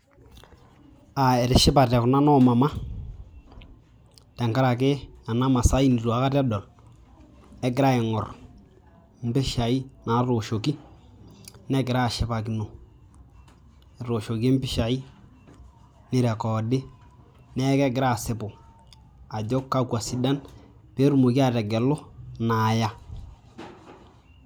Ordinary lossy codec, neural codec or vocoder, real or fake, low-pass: none; none; real; none